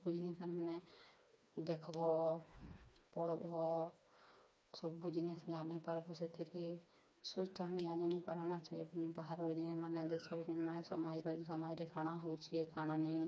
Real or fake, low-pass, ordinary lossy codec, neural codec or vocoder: fake; none; none; codec, 16 kHz, 2 kbps, FreqCodec, smaller model